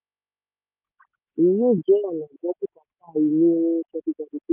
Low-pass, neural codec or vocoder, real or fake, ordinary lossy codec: 3.6 kHz; none; real; none